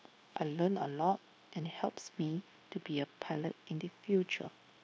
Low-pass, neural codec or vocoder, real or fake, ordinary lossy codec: none; codec, 16 kHz, 0.9 kbps, LongCat-Audio-Codec; fake; none